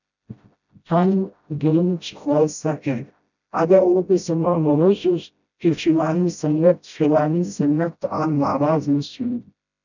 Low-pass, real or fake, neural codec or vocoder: 7.2 kHz; fake; codec, 16 kHz, 0.5 kbps, FreqCodec, smaller model